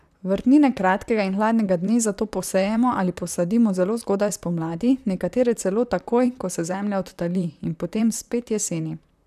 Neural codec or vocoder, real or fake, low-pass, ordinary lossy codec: vocoder, 44.1 kHz, 128 mel bands, Pupu-Vocoder; fake; 14.4 kHz; none